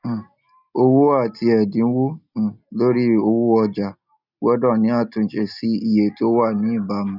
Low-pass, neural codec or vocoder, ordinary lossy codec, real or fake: 5.4 kHz; none; none; real